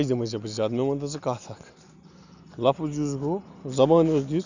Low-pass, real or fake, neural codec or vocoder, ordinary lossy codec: 7.2 kHz; real; none; none